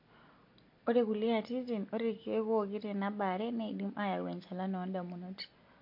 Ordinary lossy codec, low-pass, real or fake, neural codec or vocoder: MP3, 32 kbps; 5.4 kHz; real; none